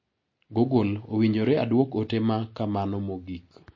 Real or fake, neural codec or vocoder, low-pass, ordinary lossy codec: real; none; 7.2 kHz; MP3, 32 kbps